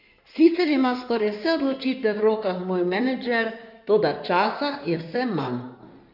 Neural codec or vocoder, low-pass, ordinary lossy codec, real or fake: codec, 16 kHz in and 24 kHz out, 2.2 kbps, FireRedTTS-2 codec; 5.4 kHz; none; fake